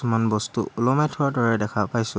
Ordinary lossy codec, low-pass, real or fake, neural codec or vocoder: none; none; real; none